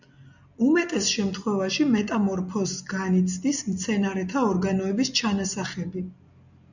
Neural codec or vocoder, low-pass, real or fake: none; 7.2 kHz; real